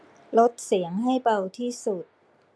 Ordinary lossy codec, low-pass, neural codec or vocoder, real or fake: none; none; none; real